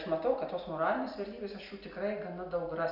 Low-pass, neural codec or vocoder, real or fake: 5.4 kHz; none; real